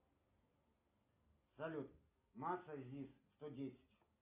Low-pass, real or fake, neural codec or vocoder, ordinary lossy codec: 3.6 kHz; real; none; Opus, 64 kbps